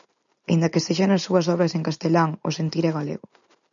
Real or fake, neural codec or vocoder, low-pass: real; none; 7.2 kHz